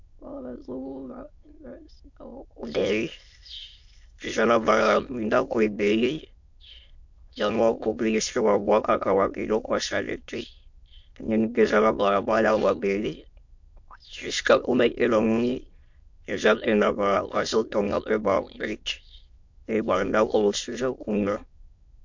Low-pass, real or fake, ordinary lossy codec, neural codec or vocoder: 7.2 kHz; fake; MP3, 48 kbps; autoencoder, 22.05 kHz, a latent of 192 numbers a frame, VITS, trained on many speakers